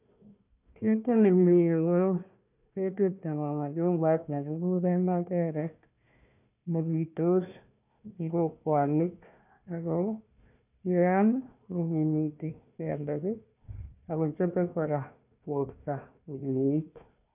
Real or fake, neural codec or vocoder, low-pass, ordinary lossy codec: fake; codec, 16 kHz, 1 kbps, FunCodec, trained on Chinese and English, 50 frames a second; 3.6 kHz; none